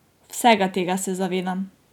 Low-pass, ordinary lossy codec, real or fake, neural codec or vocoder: 19.8 kHz; none; real; none